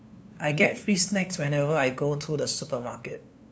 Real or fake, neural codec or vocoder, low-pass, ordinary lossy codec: fake; codec, 16 kHz, 2 kbps, FunCodec, trained on LibriTTS, 25 frames a second; none; none